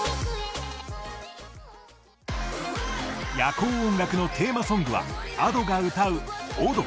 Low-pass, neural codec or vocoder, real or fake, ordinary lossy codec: none; none; real; none